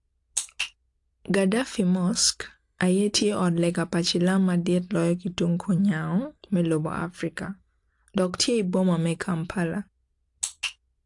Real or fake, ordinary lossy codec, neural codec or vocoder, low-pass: real; AAC, 64 kbps; none; 10.8 kHz